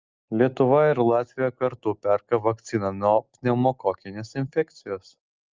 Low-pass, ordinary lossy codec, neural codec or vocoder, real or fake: 7.2 kHz; Opus, 24 kbps; none; real